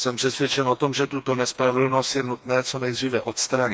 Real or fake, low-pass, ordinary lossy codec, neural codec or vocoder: fake; none; none; codec, 16 kHz, 2 kbps, FreqCodec, smaller model